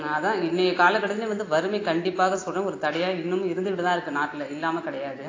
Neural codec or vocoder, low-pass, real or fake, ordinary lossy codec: none; 7.2 kHz; real; AAC, 32 kbps